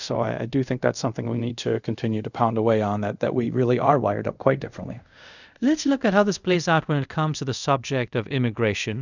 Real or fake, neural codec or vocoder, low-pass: fake; codec, 24 kHz, 0.5 kbps, DualCodec; 7.2 kHz